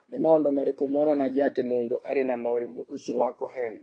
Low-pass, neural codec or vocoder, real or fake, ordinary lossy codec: 9.9 kHz; codec, 24 kHz, 1 kbps, SNAC; fake; AAC, 32 kbps